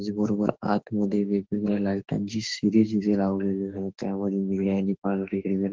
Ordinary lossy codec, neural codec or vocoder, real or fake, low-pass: Opus, 16 kbps; autoencoder, 48 kHz, 32 numbers a frame, DAC-VAE, trained on Japanese speech; fake; 7.2 kHz